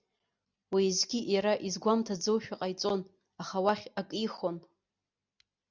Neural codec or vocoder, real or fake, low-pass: none; real; 7.2 kHz